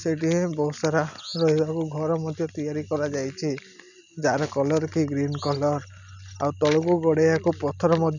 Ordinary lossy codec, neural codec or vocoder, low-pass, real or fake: none; none; 7.2 kHz; real